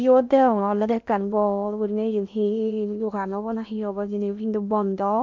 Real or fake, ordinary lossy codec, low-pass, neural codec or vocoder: fake; MP3, 64 kbps; 7.2 kHz; codec, 16 kHz in and 24 kHz out, 0.8 kbps, FocalCodec, streaming, 65536 codes